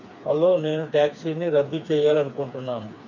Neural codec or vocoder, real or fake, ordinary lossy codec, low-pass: codec, 16 kHz, 4 kbps, FreqCodec, smaller model; fake; none; 7.2 kHz